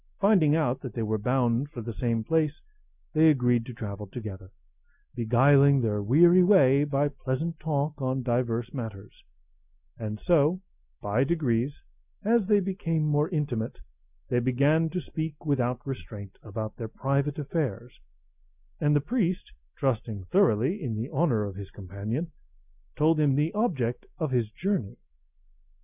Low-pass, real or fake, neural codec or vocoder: 3.6 kHz; real; none